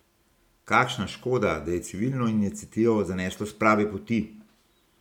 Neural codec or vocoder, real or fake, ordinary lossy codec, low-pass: none; real; MP3, 96 kbps; 19.8 kHz